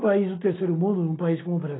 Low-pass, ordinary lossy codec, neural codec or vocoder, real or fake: 7.2 kHz; AAC, 16 kbps; none; real